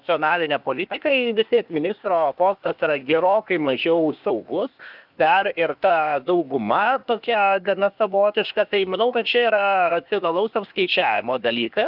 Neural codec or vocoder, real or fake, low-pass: codec, 16 kHz, 0.8 kbps, ZipCodec; fake; 5.4 kHz